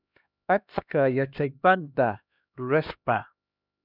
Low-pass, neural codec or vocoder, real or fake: 5.4 kHz; codec, 16 kHz, 1 kbps, X-Codec, HuBERT features, trained on LibriSpeech; fake